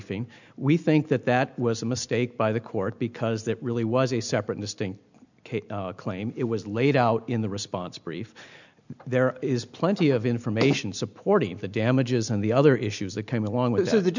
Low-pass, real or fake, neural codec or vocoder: 7.2 kHz; real; none